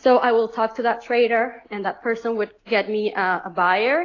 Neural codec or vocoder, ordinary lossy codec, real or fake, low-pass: none; AAC, 32 kbps; real; 7.2 kHz